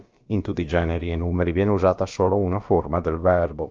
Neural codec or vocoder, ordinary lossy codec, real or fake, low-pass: codec, 16 kHz, about 1 kbps, DyCAST, with the encoder's durations; Opus, 24 kbps; fake; 7.2 kHz